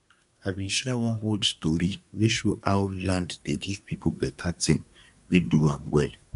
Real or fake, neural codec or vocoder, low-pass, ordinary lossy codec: fake; codec, 24 kHz, 1 kbps, SNAC; 10.8 kHz; none